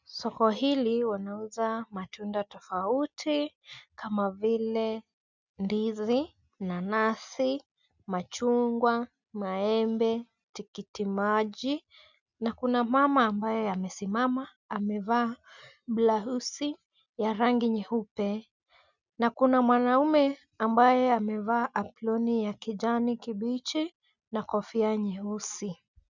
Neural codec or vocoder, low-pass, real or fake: none; 7.2 kHz; real